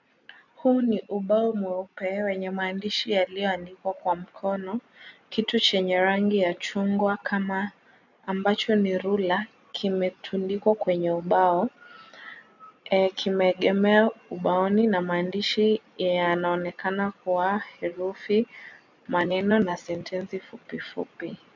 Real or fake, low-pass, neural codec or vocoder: real; 7.2 kHz; none